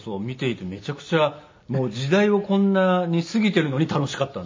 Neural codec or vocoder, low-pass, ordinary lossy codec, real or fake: none; 7.2 kHz; MP3, 32 kbps; real